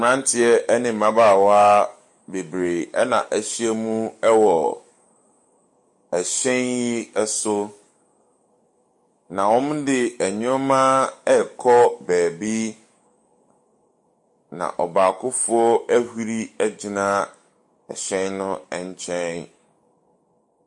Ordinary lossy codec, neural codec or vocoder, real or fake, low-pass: MP3, 48 kbps; codec, 44.1 kHz, 7.8 kbps, DAC; fake; 10.8 kHz